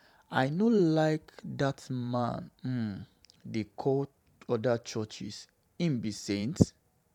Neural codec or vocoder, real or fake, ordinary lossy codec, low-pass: vocoder, 44.1 kHz, 128 mel bands every 512 samples, BigVGAN v2; fake; none; 19.8 kHz